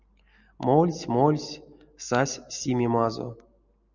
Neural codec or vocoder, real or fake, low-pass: none; real; 7.2 kHz